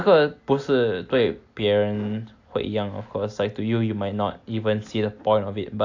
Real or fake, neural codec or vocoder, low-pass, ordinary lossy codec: real; none; 7.2 kHz; AAC, 48 kbps